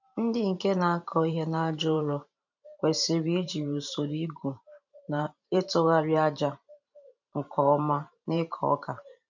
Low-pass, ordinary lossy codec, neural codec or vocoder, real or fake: 7.2 kHz; none; vocoder, 44.1 kHz, 128 mel bands every 512 samples, BigVGAN v2; fake